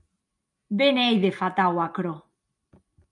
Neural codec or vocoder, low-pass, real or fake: none; 10.8 kHz; real